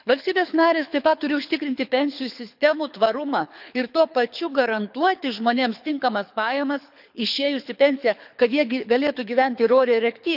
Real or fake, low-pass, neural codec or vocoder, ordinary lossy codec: fake; 5.4 kHz; codec, 24 kHz, 6 kbps, HILCodec; none